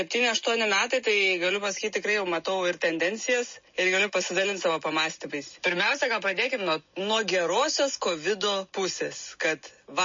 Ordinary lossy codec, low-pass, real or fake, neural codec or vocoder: MP3, 32 kbps; 7.2 kHz; real; none